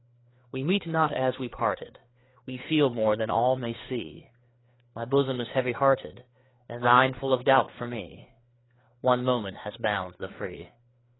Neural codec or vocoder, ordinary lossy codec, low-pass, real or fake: codec, 16 kHz, 4 kbps, FreqCodec, larger model; AAC, 16 kbps; 7.2 kHz; fake